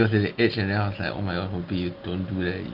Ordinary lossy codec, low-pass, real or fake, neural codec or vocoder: Opus, 24 kbps; 5.4 kHz; real; none